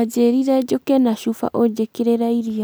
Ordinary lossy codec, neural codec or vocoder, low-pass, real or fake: none; none; none; real